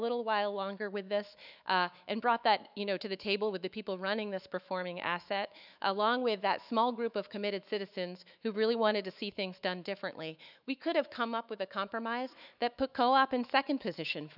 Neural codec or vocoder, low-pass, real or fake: autoencoder, 48 kHz, 128 numbers a frame, DAC-VAE, trained on Japanese speech; 5.4 kHz; fake